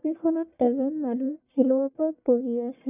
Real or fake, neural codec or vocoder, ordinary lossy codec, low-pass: fake; codec, 44.1 kHz, 1.7 kbps, Pupu-Codec; none; 3.6 kHz